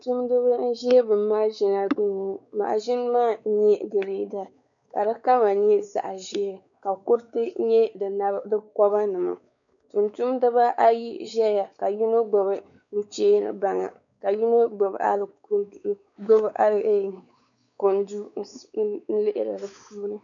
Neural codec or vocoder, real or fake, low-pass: codec, 16 kHz, 4 kbps, X-Codec, WavLM features, trained on Multilingual LibriSpeech; fake; 7.2 kHz